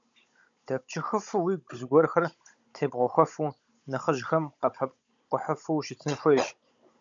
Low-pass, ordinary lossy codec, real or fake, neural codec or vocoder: 7.2 kHz; MP3, 64 kbps; fake; codec, 16 kHz, 16 kbps, FunCodec, trained on Chinese and English, 50 frames a second